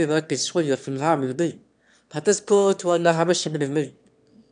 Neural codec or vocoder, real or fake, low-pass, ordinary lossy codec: autoencoder, 22.05 kHz, a latent of 192 numbers a frame, VITS, trained on one speaker; fake; 9.9 kHz; none